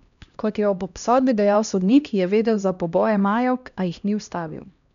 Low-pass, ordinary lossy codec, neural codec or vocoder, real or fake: 7.2 kHz; none; codec, 16 kHz, 1 kbps, X-Codec, HuBERT features, trained on LibriSpeech; fake